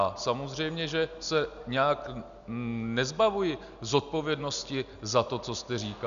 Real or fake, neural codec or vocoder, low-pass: real; none; 7.2 kHz